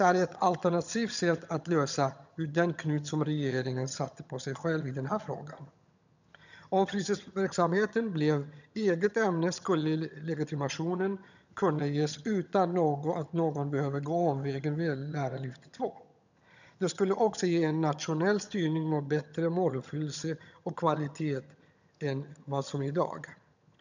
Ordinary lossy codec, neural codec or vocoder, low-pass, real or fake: none; vocoder, 22.05 kHz, 80 mel bands, HiFi-GAN; 7.2 kHz; fake